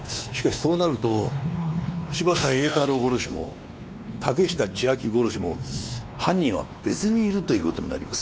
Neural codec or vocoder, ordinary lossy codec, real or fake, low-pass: codec, 16 kHz, 2 kbps, X-Codec, WavLM features, trained on Multilingual LibriSpeech; none; fake; none